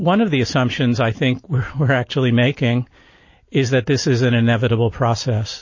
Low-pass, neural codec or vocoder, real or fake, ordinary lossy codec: 7.2 kHz; none; real; MP3, 32 kbps